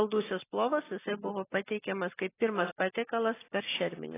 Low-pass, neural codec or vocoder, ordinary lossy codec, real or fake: 3.6 kHz; none; AAC, 16 kbps; real